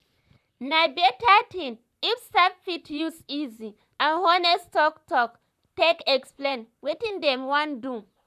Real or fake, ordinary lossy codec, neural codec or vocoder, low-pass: fake; none; vocoder, 44.1 kHz, 128 mel bands, Pupu-Vocoder; 14.4 kHz